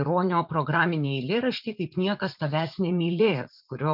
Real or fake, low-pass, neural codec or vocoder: fake; 5.4 kHz; vocoder, 44.1 kHz, 80 mel bands, Vocos